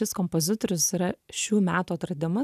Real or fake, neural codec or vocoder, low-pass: real; none; 14.4 kHz